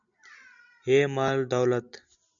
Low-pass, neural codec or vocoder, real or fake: 7.2 kHz; none; real